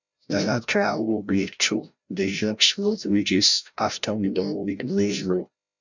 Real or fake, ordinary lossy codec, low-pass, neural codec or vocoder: fake; none; 7.2 kHz; codec, 16 kHz, 0.5 kbps, FreqCodec, larger model